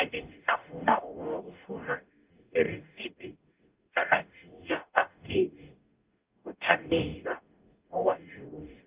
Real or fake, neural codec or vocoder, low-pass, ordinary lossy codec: fake; codec, 44.1 kHz, 0.9 kbps, DAC; 3.6 kHz; Opus, 24 kbps